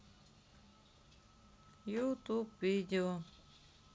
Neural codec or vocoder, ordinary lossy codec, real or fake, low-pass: none; none; real; none